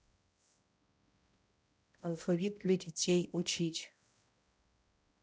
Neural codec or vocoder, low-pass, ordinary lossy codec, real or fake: codec, 16 kHz, 0.5 kbps, X-Codec, HuBERT features, trained on balanced general audio; none; none; fake